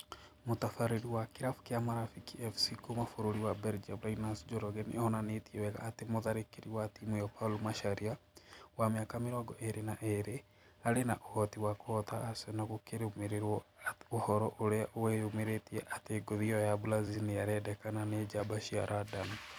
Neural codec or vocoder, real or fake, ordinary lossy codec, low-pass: vocoder, 44.1 kHz, 128 mel bands every 256 samples, BigVGAN v2; fake; none; none